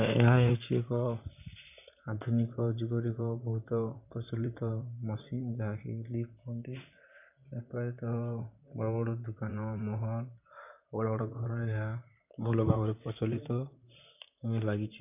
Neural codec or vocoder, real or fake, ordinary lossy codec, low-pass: vocoder, 44.1 kHz, 128 mel bands, Pupu-Vocoder; fake; none; 3.6 kHz